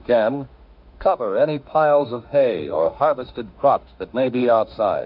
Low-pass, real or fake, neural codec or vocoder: 5.4 kHz; fake; autoencoder, 48 kHz, 32 numbers a frame, DAC-VAE, trained on Japanese speech